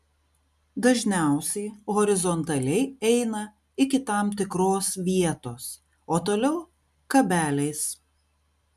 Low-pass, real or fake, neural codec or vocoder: 14.4 kHz; real; none